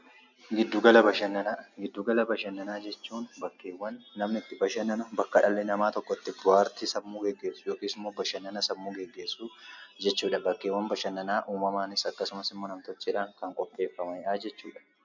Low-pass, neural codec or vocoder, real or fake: 7.2 kHz; none; real